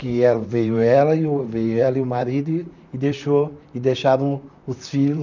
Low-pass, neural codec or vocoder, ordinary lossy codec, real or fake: 7.2 kHz; vocoder, 44.1 kHz, 128 mel bands, Pupu-Vocoder; none; fake